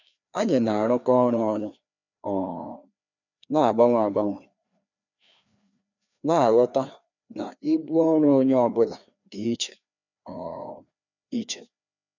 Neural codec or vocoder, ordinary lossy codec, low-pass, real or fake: codec, 16 kHz, 2 kbps, FreqCodec, larger model; none; 7.2 kHz; fake